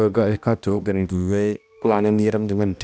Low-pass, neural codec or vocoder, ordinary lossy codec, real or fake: none; codec, 16 kHz, 1 kbps, X-Codec, HuBERT features, trained on balanced general audio; none; fake